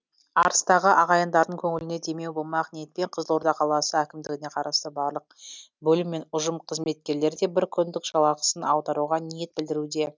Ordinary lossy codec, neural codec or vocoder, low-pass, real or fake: none; none; none; real